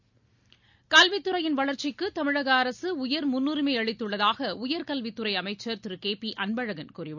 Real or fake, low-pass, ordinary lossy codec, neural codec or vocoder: real; 7.2 kHz; none; none